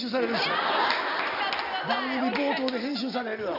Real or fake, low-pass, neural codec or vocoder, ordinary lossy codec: real; 5.4 kHz; none; AAC, 48 kbps